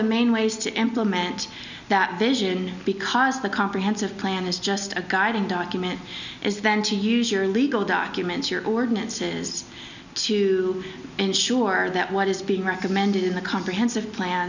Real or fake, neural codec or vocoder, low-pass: real; none; 7.2 kHz